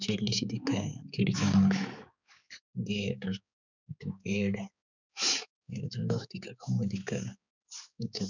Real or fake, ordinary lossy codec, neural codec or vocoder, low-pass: fake; none; codec, 16 kHz, 4 kbps, X-Codec, HuBERT features, trained on balanced general audio; 7.2 kHz